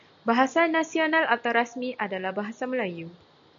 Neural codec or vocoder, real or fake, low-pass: none; real; 7.2 kHz